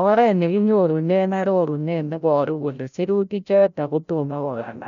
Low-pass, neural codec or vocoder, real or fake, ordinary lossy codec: 7.2 kHz; codec, 16 kHz, 0.5 kbps, FreqCodec, larger model; fake; none